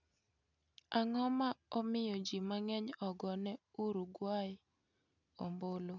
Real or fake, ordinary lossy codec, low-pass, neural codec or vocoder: real; none; 7.2 kHz; none